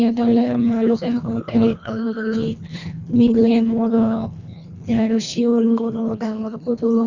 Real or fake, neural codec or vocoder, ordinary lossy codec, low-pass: fake; codec, 24 kHz, 1.5 kbps, HILCodec; Opus, 64 kbps; 7.2 kHz